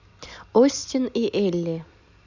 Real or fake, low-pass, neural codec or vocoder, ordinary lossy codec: real; 7.2 kHz; none; none